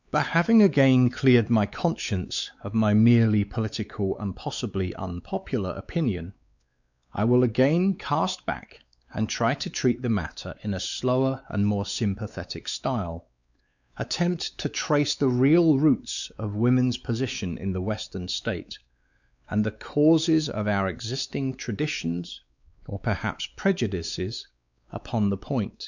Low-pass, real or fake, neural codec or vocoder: 7.2 kHz; fake; codec, 16 kHz, 4 kbps, X-Codec, WavLM features, trained on Multilingual LibriSpeech